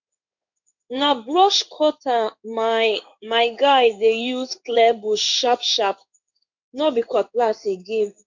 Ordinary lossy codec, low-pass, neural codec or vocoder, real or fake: none; 7.2 kHz; codec, 16 kHz in and 24 kHz out, 1 kbps, XY-Tokenizer; fake